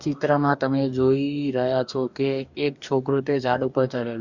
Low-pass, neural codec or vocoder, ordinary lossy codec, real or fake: 7.2 kHz; codec, 44.1 kHz, 2.6 kbps, DAC; Opus, 64 kbps; fake